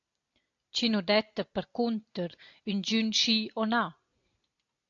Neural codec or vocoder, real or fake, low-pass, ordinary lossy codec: none; real; 7.2 kHz; AAC, 48 kbps